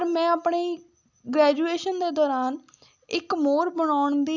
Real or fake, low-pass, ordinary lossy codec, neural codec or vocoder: real; 7.2 kHz; none; none